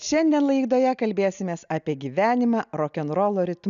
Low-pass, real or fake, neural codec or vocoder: 7.2 kHz; real; none